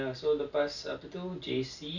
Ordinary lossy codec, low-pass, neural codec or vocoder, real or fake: none; 7.2 kHz; none; real